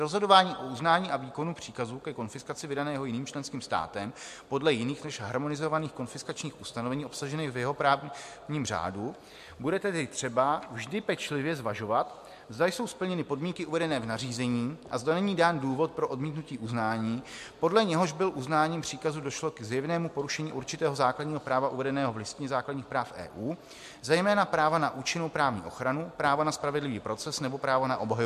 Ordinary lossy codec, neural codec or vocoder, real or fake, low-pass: MP3, 64 kbps; none; real; 14.4 kHz